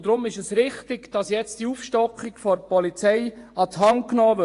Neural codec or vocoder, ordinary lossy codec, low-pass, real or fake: vocoder, 24 kHz, 100 mel bands, Vocos; AAC, 48 kbps; 10.8 kHz; fake